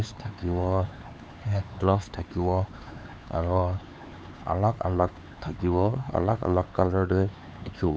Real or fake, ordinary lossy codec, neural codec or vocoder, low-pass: fake; none; codec, 16 kHz, 4 kbps, X-Codec, HuBERT features, trained on LibriSpeech; none